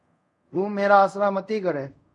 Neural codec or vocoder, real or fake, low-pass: codec, 24 kHz, 0.5 kbps, DualCodec; fake; 10.8 kHz